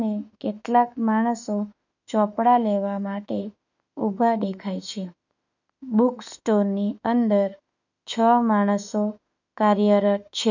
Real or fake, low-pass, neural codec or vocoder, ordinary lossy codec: fake; 7.2 kHz; autoencoder, 48 kHz, 32 numbers a frame, DAC-VAE, trained on Japanese speech; none